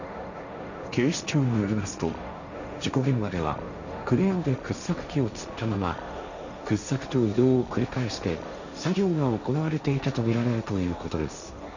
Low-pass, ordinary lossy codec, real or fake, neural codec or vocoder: 7.2 kHz; none; fake; codec, 16 kHz, 1.1 kbps, Voila-Tokenizer